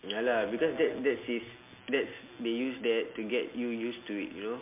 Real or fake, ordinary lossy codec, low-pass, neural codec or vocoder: real; MP3, 32 kbps; 3.6 kHz; none